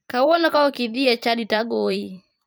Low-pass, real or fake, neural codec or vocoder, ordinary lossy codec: none; fake; vocoder, 44.1 kHz, 128 mel bands every 512 samples, BigVGAN v2; none